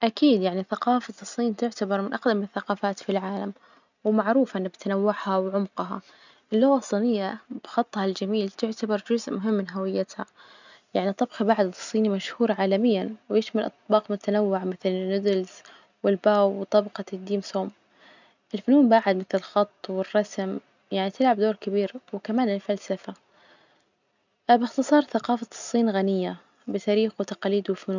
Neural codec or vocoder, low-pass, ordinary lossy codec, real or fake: none; 7.2 kHz; none; real